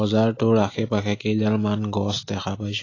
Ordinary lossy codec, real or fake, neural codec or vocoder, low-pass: AAC, 32 kbps; real; none; 7.2 kHz